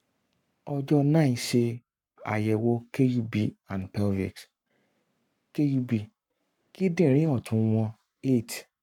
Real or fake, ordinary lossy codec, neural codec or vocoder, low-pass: fake; none; codec, 44.1 kHz, 7.8 kbps, Pupu-Codec; 19.8 kHz